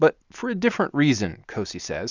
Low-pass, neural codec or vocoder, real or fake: 7.2 kHz; none; real